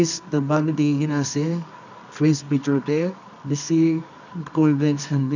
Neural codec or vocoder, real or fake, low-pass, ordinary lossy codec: codec, 24 kHz, 0.9 kbps, WavTokenizer, medium music audio release; fake; 7.2 kHz; none